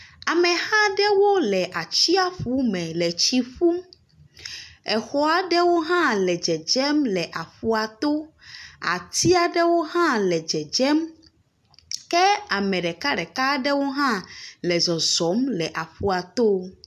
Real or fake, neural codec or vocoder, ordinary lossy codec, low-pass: real; none; MP3, 96 kbps; 14.4 kHz